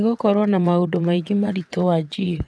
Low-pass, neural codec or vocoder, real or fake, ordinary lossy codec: none; vocoder, 22.05 kHz, 80 mel bands, WaveNeXt; fake; none